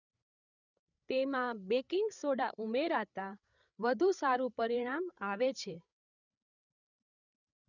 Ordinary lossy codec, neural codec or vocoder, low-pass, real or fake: none; codec, 16 kHz, 8 kbps, FreqCodec, larger model; 7.2 kHz; fake